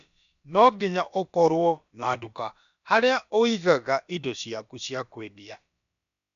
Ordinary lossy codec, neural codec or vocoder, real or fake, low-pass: none; codec, 16 kHz, about 1 kbps, DyCAST, with the encoder's durations; fake; 7.2 kHz